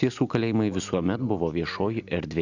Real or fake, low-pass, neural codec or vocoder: real; 7.2 kHz; none